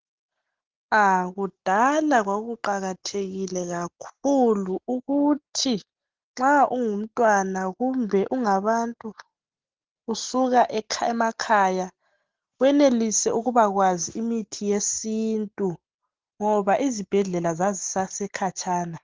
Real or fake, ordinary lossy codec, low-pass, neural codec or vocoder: real; Opus, 16 kbps; 7.2 kHz; none